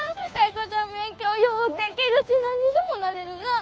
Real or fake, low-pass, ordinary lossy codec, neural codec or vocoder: fake; none; none; codec, 16 kHz, 2 kbps, FunCodec, trained on Chinese and English, 25 frames a second